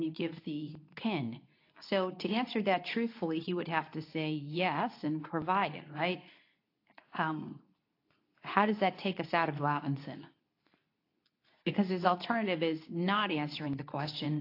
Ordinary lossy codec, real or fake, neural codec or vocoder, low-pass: AAC, 32 kbps; fake; codec, 24 kHz, 0.9 kbps, WavTokenizer, medium speech release version 2; 5.4 kHz